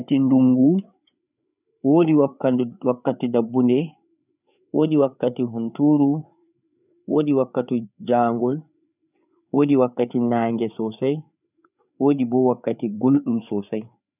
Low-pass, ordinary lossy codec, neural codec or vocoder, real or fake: 3.6 kHz; none; codec, 16 kHz, 4 kbps, FreqCodec, larger model; fake